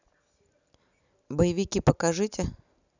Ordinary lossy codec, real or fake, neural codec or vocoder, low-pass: none; real; none; 7.2 kHz